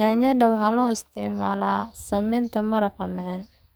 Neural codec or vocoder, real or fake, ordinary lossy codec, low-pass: codec, 44.1 kHz, 2.6 kbps, SNAC; fake; none; none